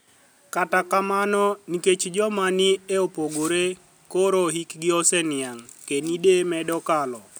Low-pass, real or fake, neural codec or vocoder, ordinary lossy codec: none; real; none; none